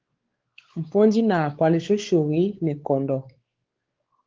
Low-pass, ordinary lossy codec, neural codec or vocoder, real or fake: 7.2 kHz; Opus, 16 kbps; codec, 16 kHz, 4 kbps, X-Codec, WavLM features, trained on Multilingual LibriSpeech; fake